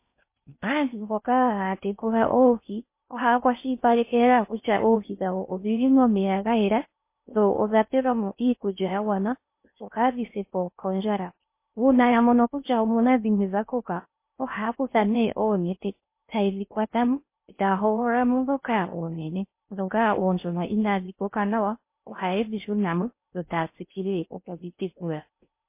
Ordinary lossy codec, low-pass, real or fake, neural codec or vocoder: MP3, 24 kbps; 3.6 kHz; fake; codec, 16 kHz in and 24 kHz out, 0.6 kbps, FocalCodec, streaming, 4096 codes